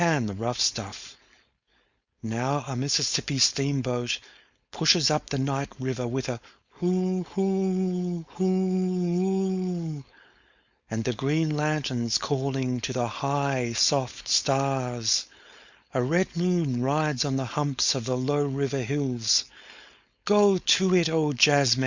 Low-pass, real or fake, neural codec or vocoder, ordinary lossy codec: 7.2 kHz; fake; codec, 16 kHz, 4.8 kbps, FACodec; Opus, 64 kbps